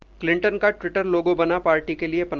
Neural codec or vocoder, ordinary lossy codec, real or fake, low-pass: none; Opus, 32 kbps; real; 7.2 kHz